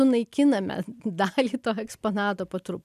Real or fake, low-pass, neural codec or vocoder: real; 14.4 kHz; none